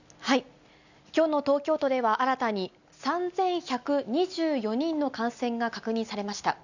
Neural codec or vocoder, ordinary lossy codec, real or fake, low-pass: none; none; real; 7.2 kHz